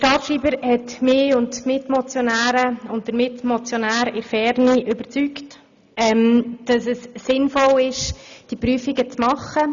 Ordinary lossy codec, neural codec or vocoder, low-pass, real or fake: none; none; 7.2 kHz; real